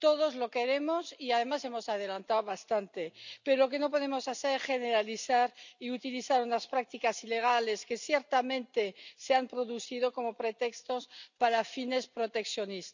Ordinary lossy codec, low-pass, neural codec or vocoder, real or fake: none; 7.2 kHz; none; real